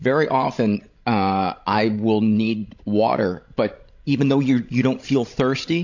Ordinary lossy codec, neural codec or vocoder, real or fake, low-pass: AAC, 48 kbps; codec, 16 kHz, 16 kbps, FreqCodec, larger model; fake; 7.2 kHz